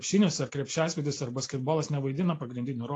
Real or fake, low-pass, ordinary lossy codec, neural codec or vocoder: real; 10.8 kHz; AAC, 48 kbps; none